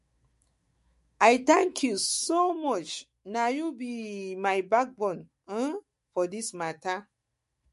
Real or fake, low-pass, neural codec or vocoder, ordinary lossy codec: fake; 14.4 kHz; autoencoder, 48 kHz, 128 numbers a frame, DAC-VAE, trained on Japanese speech; MP3, 48 kbps